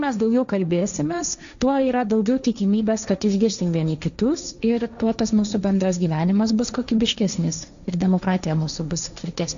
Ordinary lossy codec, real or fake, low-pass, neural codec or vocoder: AAC, 96 kbps; fake; 7.2 kHz; codec, 16 kHz, 1.1 kbps, Voila-Tokenizer